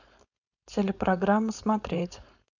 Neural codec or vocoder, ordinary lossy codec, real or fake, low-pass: codec, 16 kHz, 4.8 kbps, FACodec; none; fake; 7.2 kHz